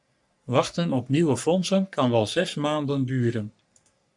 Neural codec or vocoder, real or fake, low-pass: codec, 44.1 kHz, 3.4 kbps, Pupu-Codec; fake; 10.8 kHz